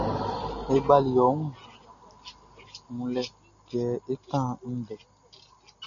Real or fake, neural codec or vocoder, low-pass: real; none; 7.2 kHz